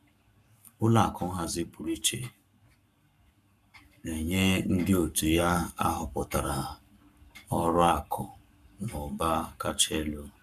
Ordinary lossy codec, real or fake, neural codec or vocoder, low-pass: none; fake; codec, 44.1 kHz, 7.8 kbps, Pupu-Codec; 14.4 kHz